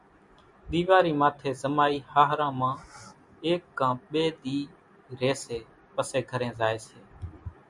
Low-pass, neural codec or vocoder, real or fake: 10.8 kHz; none; real